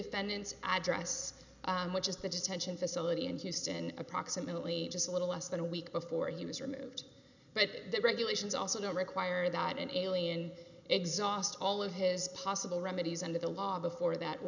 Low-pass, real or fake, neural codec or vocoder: 7.2 kHz; real; none